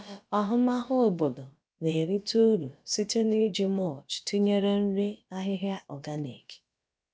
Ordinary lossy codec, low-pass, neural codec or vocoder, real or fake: none; none; codec, 16 kHz, about 1 kbps, DyCAST, with the encoder's durations; fake